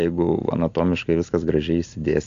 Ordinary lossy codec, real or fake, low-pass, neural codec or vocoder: AAC, 64 kbps; real; 7.2 kHz; none